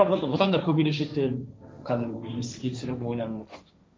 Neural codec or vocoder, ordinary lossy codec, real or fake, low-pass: codec, 16 kHz, 1.1 kbps, Voila-Tokenizer; none; fake; none